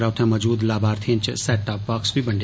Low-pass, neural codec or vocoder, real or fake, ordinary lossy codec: none; none; real; none